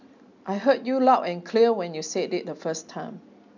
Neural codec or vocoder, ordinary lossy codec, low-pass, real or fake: none; none; 7.2 kHz; real